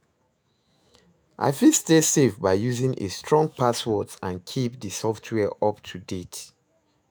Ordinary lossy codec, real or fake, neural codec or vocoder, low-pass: none; fake; autoencoder, 48 kHz, 128 numbers a frame, DAC-VAE, trained on Japanese speech; none